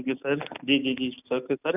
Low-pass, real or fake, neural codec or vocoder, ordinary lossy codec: 3.6 kHz; real; none; none